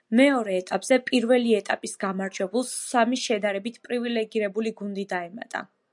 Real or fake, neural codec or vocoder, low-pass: real; none; 10.8 kHz